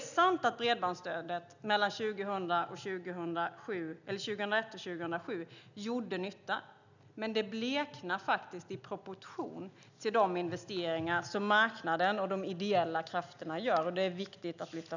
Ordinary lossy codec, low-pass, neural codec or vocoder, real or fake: none; 7.2 kHz; none; real